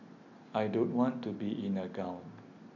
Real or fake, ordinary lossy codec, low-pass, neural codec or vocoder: real; none; 7.2 kHz; none